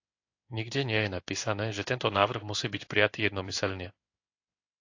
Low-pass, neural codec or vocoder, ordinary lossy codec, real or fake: 7.2 kHz; codec, 16 kHz in and 24 kHz out, 1 kbps, XY-Tokenizer; AAC, 48 kbps; fake